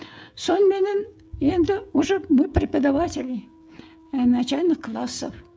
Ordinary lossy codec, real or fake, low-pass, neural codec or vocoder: none; fake; none; codec, 16 kHz, 16 kbps, FreqCodec, smaller model